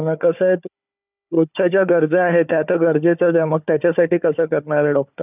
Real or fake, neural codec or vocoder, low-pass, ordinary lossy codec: fake; codec, 16 kHz, 4 kbps, FunCodec, trained on Chinese and English, 50 frames a second; 3.6 kHz; none